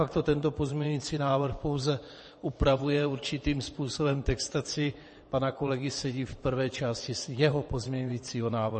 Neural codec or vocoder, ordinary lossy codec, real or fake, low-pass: vocoder, 22.05 kHz, 80 mel bands, WaveNeXt; MP3, 32 kbps; fake; 9.9 kHz